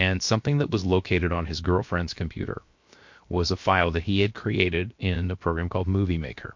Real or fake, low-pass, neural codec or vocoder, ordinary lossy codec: fake; 7.2 kHz; codec, 16 kHz, 0.7 kbps, FocalCodec; MP3, 48 kbps